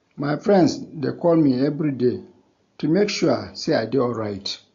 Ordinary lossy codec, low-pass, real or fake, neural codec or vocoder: AAC, 64 kbps; 7.2 kHz; real; none